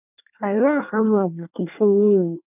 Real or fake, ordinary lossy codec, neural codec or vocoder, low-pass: fake; none; codec, 24 kHz, 1 kbps, SNAC; 3.6 kHz